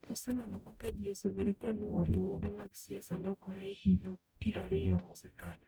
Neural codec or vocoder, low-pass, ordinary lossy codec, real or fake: codec, 44.1 kHz, 0.9 kbps, DAC; none; none; fake